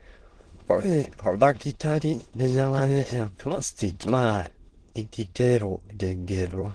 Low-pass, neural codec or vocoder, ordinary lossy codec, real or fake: 9.9 kHz; autoencoder, 22.05 kHz, a latent of 192 numbers a frame, VITS, trained on many speakers; Opus, 16 kbps; fake